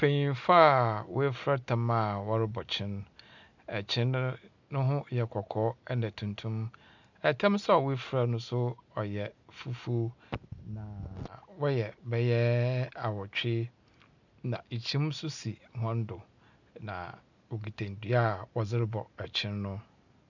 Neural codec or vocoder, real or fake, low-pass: none; real; 7.2 kHz